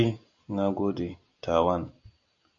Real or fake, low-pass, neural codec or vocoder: real; 7.2 kHz; none